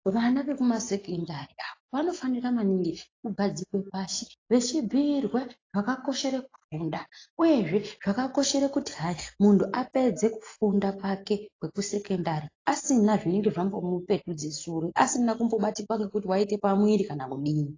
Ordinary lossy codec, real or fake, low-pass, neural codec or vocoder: AAC, 32 kbps; real; 7.2 kHz; none